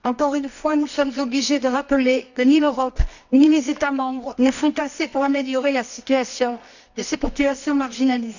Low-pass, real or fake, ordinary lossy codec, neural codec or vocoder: 7.2 kHz; fake; none; codec, 24 kHz, 0.9 kbps, WavTokenizer, medium music audio release